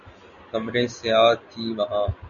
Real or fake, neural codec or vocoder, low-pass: real; none; 7.2 kHz